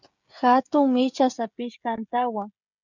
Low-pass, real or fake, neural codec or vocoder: 7.2 kHz; fake; codec, 16 kHz, 16 kbps, FreqCodec, smaller model